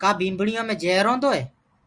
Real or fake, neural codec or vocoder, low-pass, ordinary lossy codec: real; none; 9.9 kHz; Opus, 64 kbps